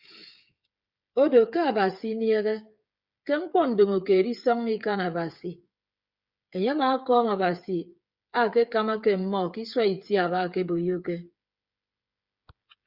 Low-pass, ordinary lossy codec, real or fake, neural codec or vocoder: 5.4 kHz; Opus, 64 kbps; fake; codec, 16 kHz, 8 kbps, FreqCodec, smaller model